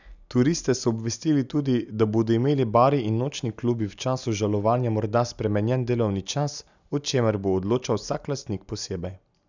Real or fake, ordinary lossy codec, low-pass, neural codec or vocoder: real; none; 7.2 kHz; none